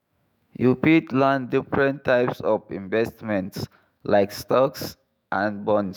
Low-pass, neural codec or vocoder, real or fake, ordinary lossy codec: none; autoencoder, 48 kHz, 128 numbers a frame, DAC-VAE, trained on Japanese speech; fake; none